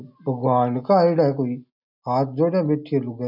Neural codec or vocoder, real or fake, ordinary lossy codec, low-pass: none; real; none; 5.4 kHz